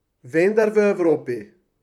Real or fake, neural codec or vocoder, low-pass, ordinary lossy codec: fake; vocoder, 44.1 kHz, 128 mel bands, Pupu-Vocoder; 19.8 kHz; none